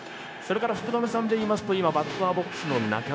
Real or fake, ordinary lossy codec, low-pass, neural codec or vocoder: fake; none; none; codec, 16 kHz, 0.9 kbps, LongCat-Audio-Codec